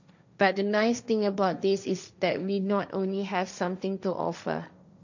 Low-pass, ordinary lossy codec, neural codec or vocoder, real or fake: 7.2 kHz; none; codec, 16 kHz, 1.1 kbps, Voila-Tokenizer; fake